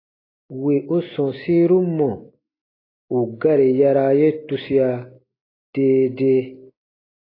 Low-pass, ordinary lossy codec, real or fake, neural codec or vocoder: 5.4 kHz; AAC, 24 kbps; real; none